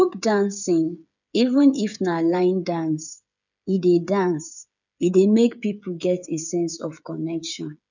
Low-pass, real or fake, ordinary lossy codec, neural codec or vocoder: 7.2 kHz; fake; none; codec, 16 kHz, 8 kbps, FreqCodec, smaller model